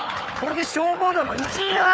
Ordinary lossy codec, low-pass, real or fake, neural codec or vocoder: none; none; fake; codec, 16 kHz, 4 kbps, FunCodec, trained on Chinese and English, 50 frames a second